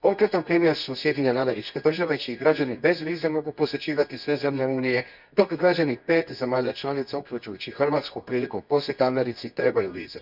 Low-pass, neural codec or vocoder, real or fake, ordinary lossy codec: 5.4 kHz; codec, 24 kHz, 0.9 kbps, WavTokenizer, medium music audio release; fake; none